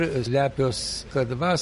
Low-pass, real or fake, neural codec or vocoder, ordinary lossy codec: 14.4 kHz; real; none; MP3, 48 kbps